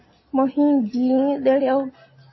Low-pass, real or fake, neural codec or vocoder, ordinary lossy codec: 7.2 kHz; real; none; MP3, 24 kbps